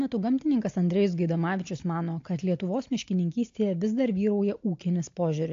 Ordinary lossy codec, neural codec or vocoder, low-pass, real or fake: AAC, 48 kbps; none; 7.2 kHz; real